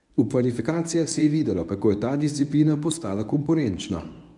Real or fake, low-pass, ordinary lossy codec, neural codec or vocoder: fake; 10.8 kHz; none; codec, 24 kHz, 0.9 kbps, WavTokenizer, medium speech release version 1